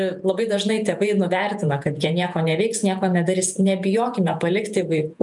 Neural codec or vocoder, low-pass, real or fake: vocoder, 48 kHz, 128 mel bands, Vocos; 10.8 kHz; fake